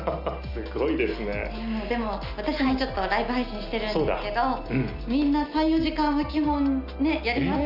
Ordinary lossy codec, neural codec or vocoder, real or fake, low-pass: none; none; real; 5.4 kHz